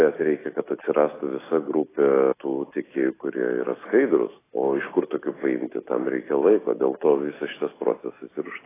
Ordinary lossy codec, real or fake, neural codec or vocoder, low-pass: AAC, 16 kbps; real; none; 3.6 kHz